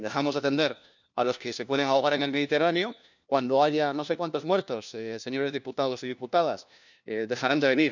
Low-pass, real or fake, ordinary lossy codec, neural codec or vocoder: 7.2 kHz; fake; none; codec, 16 kHz, 1 kbps, FunCodec, trained on LibriTTS, 50 frames a second